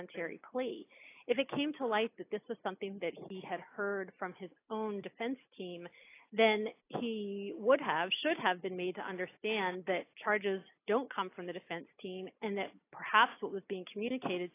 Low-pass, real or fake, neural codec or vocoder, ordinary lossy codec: 3.6 kHz; real; none; AAC, 24 kbps